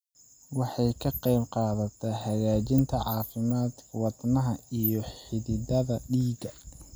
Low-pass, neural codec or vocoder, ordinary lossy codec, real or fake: none; none; none; real